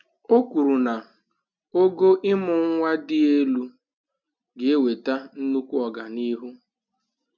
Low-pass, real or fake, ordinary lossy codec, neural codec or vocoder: 7.2 kHz; real; none; none